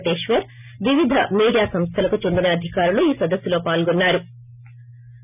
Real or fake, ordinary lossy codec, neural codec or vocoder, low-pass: real; none; none; 3.6 kHz